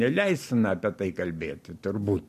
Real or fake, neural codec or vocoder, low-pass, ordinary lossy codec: real; none; 14.4 kHz; MP3, 64 kbps